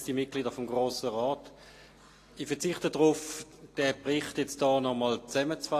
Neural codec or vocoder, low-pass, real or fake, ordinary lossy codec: none; 14.4 kHz; real; AAC, 48 kbps